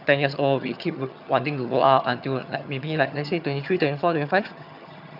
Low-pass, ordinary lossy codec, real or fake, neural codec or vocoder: 5.4 kHz; none; fake; vocoder, 22.05 kHz, 80 mel bands, HiFi-GAN